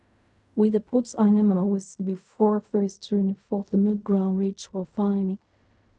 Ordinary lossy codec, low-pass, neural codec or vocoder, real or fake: none; 10.8 kHz; codec, 16 kHz in and 24 kHz out, 0.4 kbps, LongCat-Audio-Codec, fine tuned four codebook decoder; fake